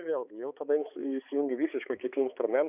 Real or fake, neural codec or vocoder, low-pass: fake; codec, 16 kHz, 4 kbps, X-Codec, HuBERT features, trained on balanced general audio; 3.6 kHz